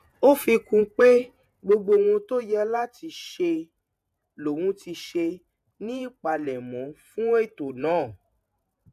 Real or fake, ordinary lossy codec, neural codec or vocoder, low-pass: fake; MP3, 96 kbps; vocoder, 48 kHz, 128 mel bands, Vocos; 14.4 kHz